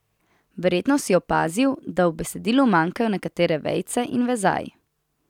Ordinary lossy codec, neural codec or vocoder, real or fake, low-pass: none; none; real; 19.8 kHz